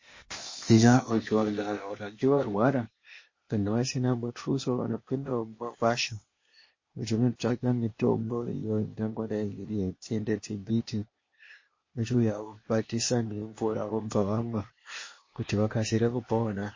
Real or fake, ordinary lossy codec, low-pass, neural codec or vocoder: fake; MP3, 32 kbps; 7.2 kHz; codec, 16 kHz, 0.8 kbps, ZipCodec